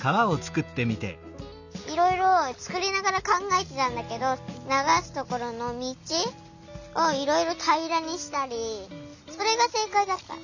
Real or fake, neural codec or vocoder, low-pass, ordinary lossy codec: real; none; 7.2 kHz; none